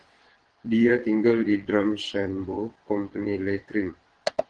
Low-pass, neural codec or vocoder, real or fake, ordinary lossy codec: 9.9 kHz; vocoder, 22.05 kHz, 80 mel bands, WaveNeXt; fake; Opus, 16 kbps